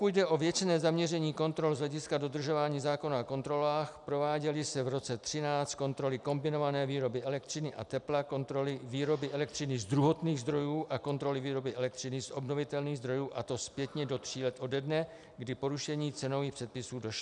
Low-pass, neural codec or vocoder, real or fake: 10.8 kHz; none; real